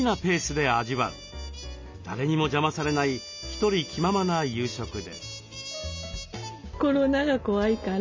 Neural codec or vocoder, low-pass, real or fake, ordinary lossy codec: none; 7.2 kHz; real; MP3, 64 kbps